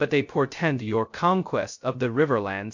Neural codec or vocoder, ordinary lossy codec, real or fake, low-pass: codec, 16 kHz, 0.2 kbps, FocalCodec; MP3, 48 kbps; fake; 7.2 kHz